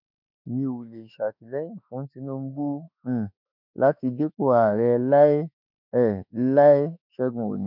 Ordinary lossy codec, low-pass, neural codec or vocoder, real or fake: none; 5.4 kHz; autoencoder, 48 kHz, 32 numbers a frame, DAC-VAE, trained on Japanese speech; fake